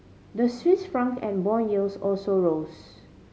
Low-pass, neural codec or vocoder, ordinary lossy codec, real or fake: none; none; none; real